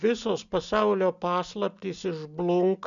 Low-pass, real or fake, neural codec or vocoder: 7.2 kHz; real; none